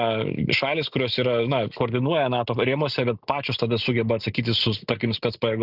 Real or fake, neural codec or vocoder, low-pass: real; none; 5.4 kHz